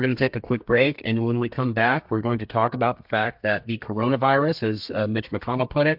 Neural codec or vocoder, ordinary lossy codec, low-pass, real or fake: codec, 32 kHz, 1.9 kbps, SNAC; MP3, 48 kbps; 5.4 kHz; fake